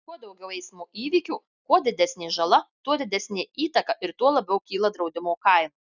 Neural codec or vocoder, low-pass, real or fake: none; 7.2 kHz; real